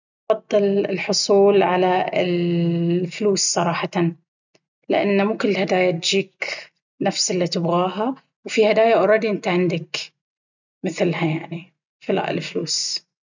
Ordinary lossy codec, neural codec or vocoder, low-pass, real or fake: none; none; 7.2 kHz; real